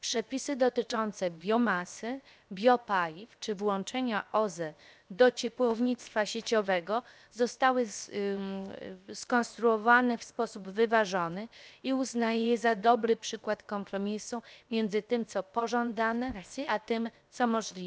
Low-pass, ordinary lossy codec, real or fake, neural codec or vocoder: none; none; fake; codec, 16 kHz, 0.7 kbps, FocalCodec